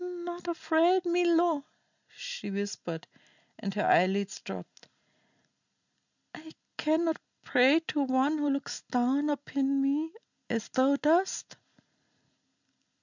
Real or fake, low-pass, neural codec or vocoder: real; 7.2 kHz; none